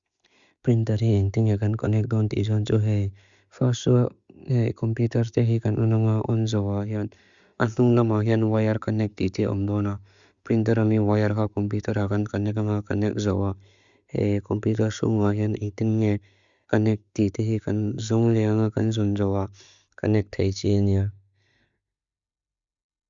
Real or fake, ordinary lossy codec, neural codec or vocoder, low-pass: real; Opus, 64 kbps; none; 7.2 kHz